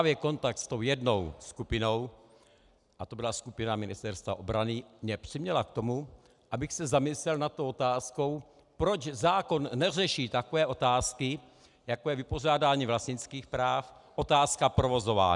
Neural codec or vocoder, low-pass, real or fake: none; 10.8 kHz; real